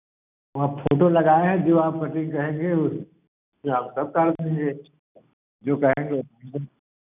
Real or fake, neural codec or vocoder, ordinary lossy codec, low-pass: real; none; none; 3.6 kHz